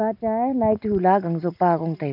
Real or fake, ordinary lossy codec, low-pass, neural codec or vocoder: real; none; 5.4 kHz; none